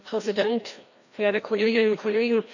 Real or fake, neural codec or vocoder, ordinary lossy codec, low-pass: fake; codec, 16 kHz, 1 kbps, FreqCodec, larger model; none; 7.2 kHz